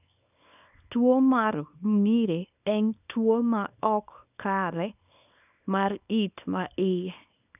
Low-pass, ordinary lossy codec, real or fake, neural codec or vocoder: 3.6 kHz; none; fake; codec, 24 kHz, 0.9 kbps, WavTokenizer, small release